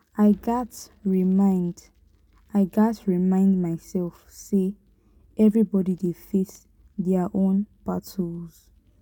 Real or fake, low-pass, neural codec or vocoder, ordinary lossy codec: real; 19.8 kHz; none; none